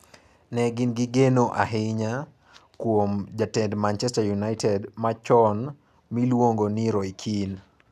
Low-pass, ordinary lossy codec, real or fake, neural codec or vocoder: 14.4 kHz; none; real; none